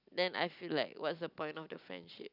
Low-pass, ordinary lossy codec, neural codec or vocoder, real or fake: 5.4 kHz; none; none; real